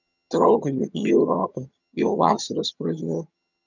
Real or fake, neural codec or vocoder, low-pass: fake; vocoder, 22.05 kHz, 80 mel bands, HiFi-GAN; 7.2 kHz